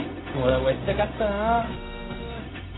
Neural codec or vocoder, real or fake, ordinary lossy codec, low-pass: codec, 16 kHz, 0.4 kbps, LongCat-Audio-Codec; fake; AAC, 16 kbps; 7.2 kHz